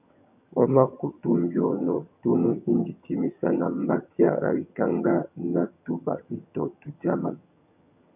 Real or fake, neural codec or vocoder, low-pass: fake; vocoder, 22.05 kHz, 80 mel bands, HiFi-GAN; 3.6 kHz